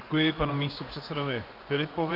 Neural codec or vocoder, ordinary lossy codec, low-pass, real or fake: vocoder, 24 kHz, 100 mel bands, Vocos; Opus, 24 kbps; 5.4 kHz; fake